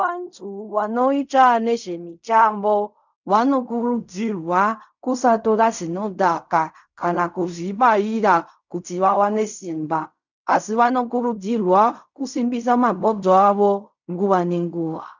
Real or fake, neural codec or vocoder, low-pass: fake; codec, 16 kHz in and 24 kHz out, 0.4 kbps, LongCat-Audio-Codec, fine tuned four codebook decoder; 7.2 kHz